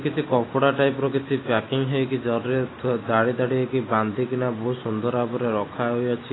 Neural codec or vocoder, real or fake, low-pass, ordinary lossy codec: none; real; 7.2 kHz; AAC, 16 kbps